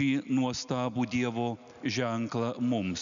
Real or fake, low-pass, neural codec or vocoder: real; 7.2 kHz; none